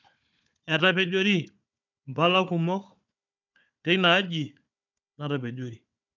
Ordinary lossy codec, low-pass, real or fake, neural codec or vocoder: none; 7.2 kHz; fake; codec, 16 kHz, 4 kbps, FunCodec, trained on Chinese and English, 50 frames a second